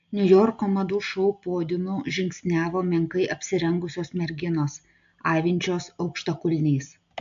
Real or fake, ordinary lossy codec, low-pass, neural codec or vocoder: real; AAC, 64 kbps; 7.2 kHz; none